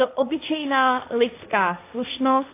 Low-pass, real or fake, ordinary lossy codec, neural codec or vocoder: 3.6 kHz; fake; AAC, 24 kbps; codec, 16 kHz, 1.1 kbps, Voila-Tokenizer